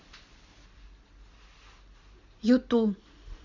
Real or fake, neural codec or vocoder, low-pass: real; none; 7.2 kHz